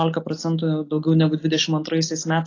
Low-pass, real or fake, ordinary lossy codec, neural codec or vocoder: 7.2 kHz; real; AAC, 32 kbps; none